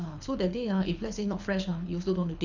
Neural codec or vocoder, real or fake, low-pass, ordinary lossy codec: codec, 24 kHz, 6 kbps, HILCodec; fake; 7.2 kHz; none